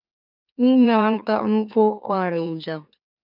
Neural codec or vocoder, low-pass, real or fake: autoencoder, 44.1 kHz, a latent of 192 numbers a frame, MeloTTS; 5.4 kHz; fake